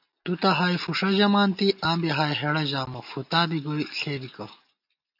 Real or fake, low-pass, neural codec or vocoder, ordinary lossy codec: real; 5.4 kHz; none; AAC, 48 kbps